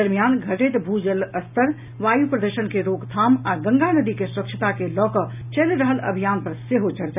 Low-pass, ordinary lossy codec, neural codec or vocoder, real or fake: 3.6 kHz; none; none; real